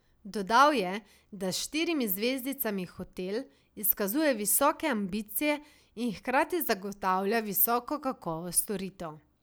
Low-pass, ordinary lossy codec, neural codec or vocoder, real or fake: none; none; none; real